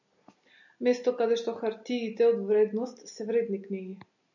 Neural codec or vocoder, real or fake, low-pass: none; real; 7.2 kHz